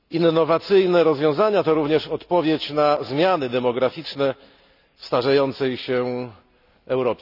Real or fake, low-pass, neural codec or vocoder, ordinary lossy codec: real; 5.4 kHz; none; none